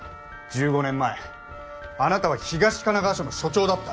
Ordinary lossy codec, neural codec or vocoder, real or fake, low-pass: none; none; real; none